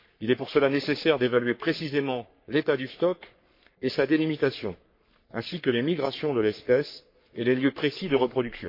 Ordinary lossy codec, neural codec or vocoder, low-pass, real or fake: MP3, 32 kbps; codec, 44.1 kHz, 3.4 kbps, Pupu-Codec; 5.4 kHz; fake